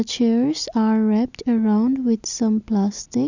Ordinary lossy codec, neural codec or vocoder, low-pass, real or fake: none; none; 7.2 kHz; real